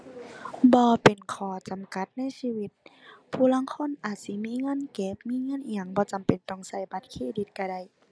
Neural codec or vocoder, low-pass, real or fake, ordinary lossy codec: none; none; real; none